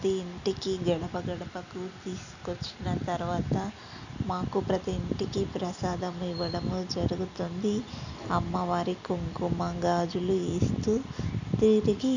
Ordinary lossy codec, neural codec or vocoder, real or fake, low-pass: none; none; real; 7.2 kHz